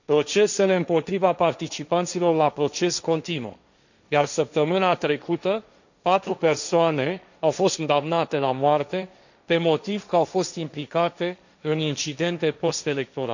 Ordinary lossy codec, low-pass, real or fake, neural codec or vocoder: none; 7.2 kHz; fake; codec, 16 kHz, 1.1 kbps, Voila-Tokenizer